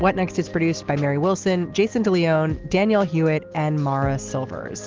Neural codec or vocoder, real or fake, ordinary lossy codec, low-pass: none; real; Opus, 16 kbps; 7.2 kHz